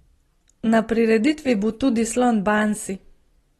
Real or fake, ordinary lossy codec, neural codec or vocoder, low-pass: real; AAC, 32 kbps; none; 19.8 kHz